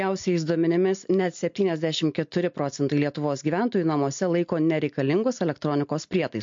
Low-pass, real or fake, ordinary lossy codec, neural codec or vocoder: 7.2 kHz; real; MP3, 48 kbps; none